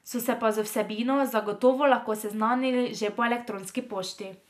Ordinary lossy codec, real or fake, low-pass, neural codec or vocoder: none; real; 14.4 kHz; none